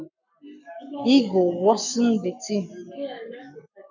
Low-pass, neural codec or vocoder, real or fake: 7.2 kHz; codec, 44.1 kHz, 7.8 kbps, Pupu-Codec; fake